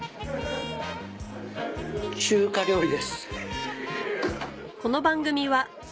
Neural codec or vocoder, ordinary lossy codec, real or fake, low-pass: none; none; real; none